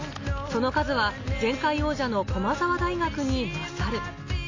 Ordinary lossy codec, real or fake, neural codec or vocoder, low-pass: AAC, 32 kbps; real; none; 7.2 kHz